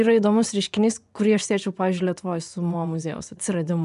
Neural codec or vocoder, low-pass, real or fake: vocoder, 24 kHz, 100 mel bands, Vocos; 10.8 kHz; fake